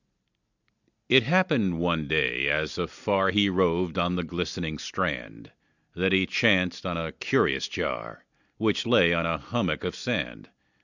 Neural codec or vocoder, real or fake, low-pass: none; real; 7.2 kHz